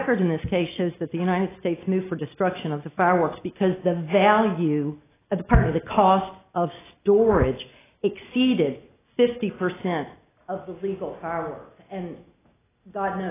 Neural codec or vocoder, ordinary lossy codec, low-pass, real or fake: none; AAC, 16 kbps; 3.6 kHz; real